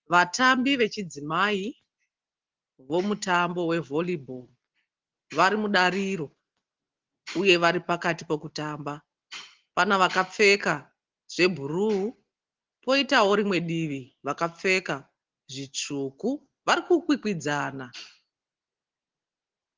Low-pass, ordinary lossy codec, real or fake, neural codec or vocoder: 7.2 kHz; Opus, 16 kbps; real; none